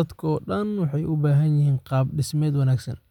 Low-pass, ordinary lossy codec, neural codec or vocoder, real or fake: 19.8 kHz; none; none; real